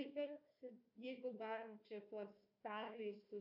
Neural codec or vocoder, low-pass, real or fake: codec, 16 kHz, 1 kbps, FunCodec, trained on Chinese and English, 50 frames a second; 5.4 kHz; fake